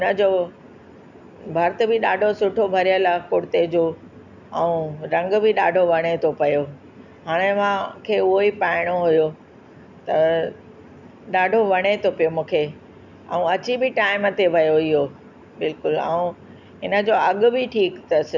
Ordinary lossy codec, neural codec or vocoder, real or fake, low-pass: none; none; real; 7.2 kHz